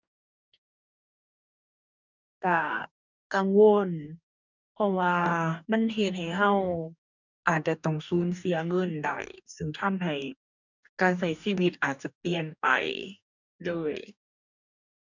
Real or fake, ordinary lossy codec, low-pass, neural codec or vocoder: fake; none; 7.2 kHz; codec, 44.1 kHz, 2.6 kbps, DAC